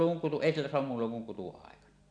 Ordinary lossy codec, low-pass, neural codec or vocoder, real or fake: none; 9.9 kHz; none; real